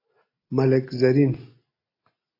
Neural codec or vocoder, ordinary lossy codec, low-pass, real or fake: none; AAC, 24 kbps; 5.4 kHz; real